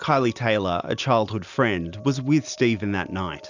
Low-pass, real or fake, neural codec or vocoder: 7.2 kHz; real; none